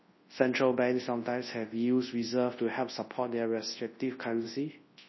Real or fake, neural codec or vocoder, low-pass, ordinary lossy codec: fake; codec, 24 kHz, 0.9 kbps, WavTokenizer, large speech release; 7.2 kHz; MP3, 24 kbps